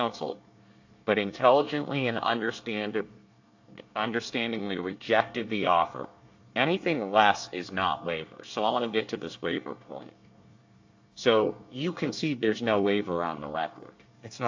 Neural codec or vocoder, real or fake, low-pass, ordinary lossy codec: codec, 24 kHz, 1 kbps, SNAC; fake; 7.2 kHz; AAC, 48 kbps